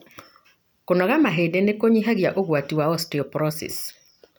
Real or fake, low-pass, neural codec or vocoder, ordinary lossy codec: real; none; none; none